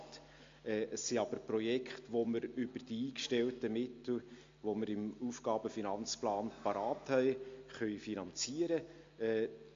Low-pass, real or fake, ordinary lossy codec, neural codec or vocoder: 7.2 kHz; real; MP3, 48 kbps; none